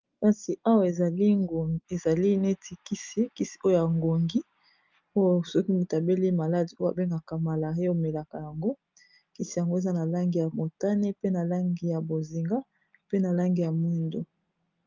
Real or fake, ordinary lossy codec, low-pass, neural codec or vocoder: real; Opus, 24 kbps; 7.2 kHz; none